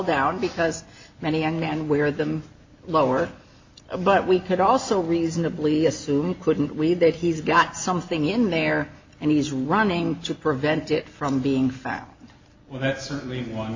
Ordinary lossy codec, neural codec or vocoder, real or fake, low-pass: MP3, 64 kbps; none; real; 7.2 kHz